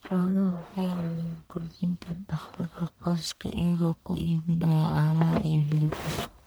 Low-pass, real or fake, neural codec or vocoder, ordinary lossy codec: none; fake; codec, 44.1 kHz, 1.7 kbps, Pupu-Codec; none